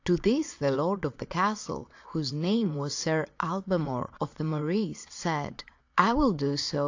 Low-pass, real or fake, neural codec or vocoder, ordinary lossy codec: 7.2 kHz; fake; vocoder, 22.05 kHz, 80 mel bands, Vocos; AAC, 48 kbps